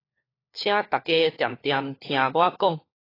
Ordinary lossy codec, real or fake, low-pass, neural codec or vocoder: AAC, 24 kbps; fake; 5.4 kHz; codec, 16 kHz, 4 kbps, FunCodec, trained on LibriTTS, 50 frames a second